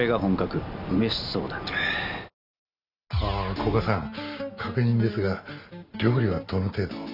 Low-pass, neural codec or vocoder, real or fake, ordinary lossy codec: 5.4 kHz; none; real; none